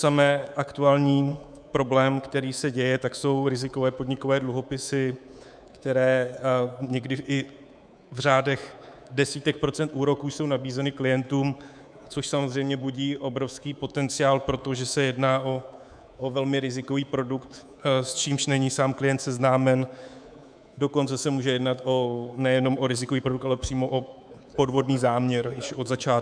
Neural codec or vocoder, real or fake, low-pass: codec, 24 kHz, 3.1 kbps, DualCodec; fake; 9.9 kHz